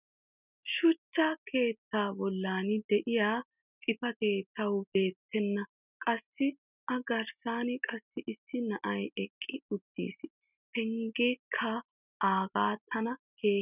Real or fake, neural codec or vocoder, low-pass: real; none; 3.6 kHz